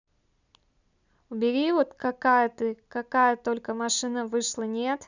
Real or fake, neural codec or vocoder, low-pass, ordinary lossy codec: real; none; 7.2 kHz; none